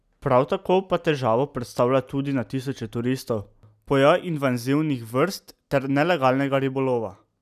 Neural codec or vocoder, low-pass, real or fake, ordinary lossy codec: none; 14.4 kHz; real; none